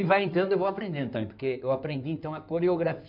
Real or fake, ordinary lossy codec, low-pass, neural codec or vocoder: fake; none; 5.4 kHz; codec, 16 kHz in and 24 kHz out, 2.2 kbps, FireRedTTS-2 codec